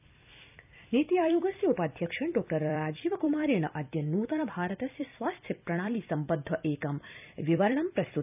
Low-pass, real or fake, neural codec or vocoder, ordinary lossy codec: 3.6 kHz; fake; vocoder, 44.1 kHz, 128 mel bands every 256 samples, BigVGAN v2; none